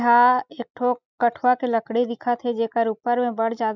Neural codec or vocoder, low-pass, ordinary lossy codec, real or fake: none; 7.2 kHz; none; real